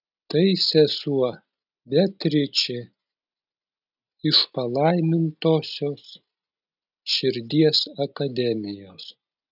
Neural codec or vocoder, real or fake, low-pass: none; real; 5.4 kHz